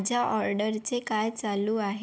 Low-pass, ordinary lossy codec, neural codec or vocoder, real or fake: none; none; none; real